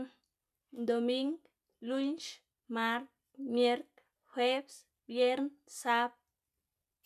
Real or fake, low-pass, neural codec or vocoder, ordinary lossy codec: real; 14.4 kHz; none; none